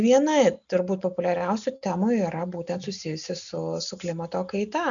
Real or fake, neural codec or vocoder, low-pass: real; none; 7.2 kHz